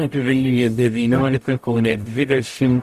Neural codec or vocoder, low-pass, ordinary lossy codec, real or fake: codec, 44.1 kHz, 0.9 kbps, DAC; 14.4 kHz; Opus, 64 kbps; fake